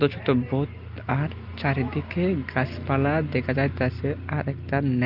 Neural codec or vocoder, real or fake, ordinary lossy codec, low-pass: none; real; Opus, 24 kbps; 5.4 kHz